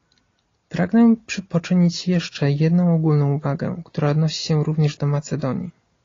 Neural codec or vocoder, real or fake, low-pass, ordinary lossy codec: none; real; 7.2 kHz; AAC, 32 kbps